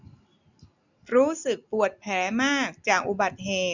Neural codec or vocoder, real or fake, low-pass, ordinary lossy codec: none; real; 7.2 kHz; none